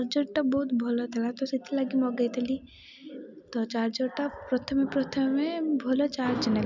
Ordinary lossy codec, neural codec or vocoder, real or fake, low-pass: none; none; real; 7.2 kHz